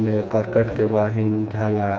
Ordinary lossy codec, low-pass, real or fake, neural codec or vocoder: none; none; fake; codec, 16 kHz, 2 kbps, FreqCodec, smaller model